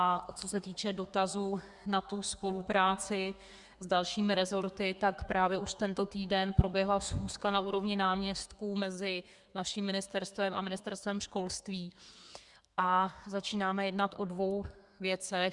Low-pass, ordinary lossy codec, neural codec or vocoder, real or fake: 10.8 kHz; Opus, 64 kbps; codec, 32 kHz, 1.9 kbps, SNAC; fake